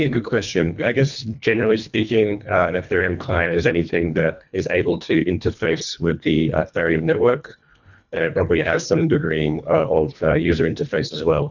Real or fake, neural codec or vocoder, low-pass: fake; codec, 24 kHz, 1.5 kbps, HILCodec; 7.2 kHz